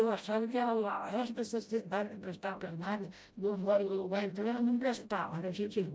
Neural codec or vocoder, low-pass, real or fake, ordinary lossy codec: codec, 16 kHz, 0.5 kbps, FreqCodec, smaller model; none; fake; none